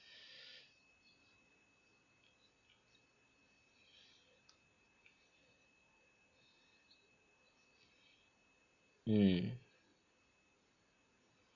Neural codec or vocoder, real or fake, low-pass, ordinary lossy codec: none; real; 7.2 kHz; none